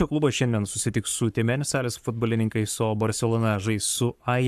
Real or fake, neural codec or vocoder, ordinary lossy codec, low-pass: fake; codec, 44.1 kHz, 7.8 kbps, Pupu-Codec; AAC, 96 kbps; 14.4 kHz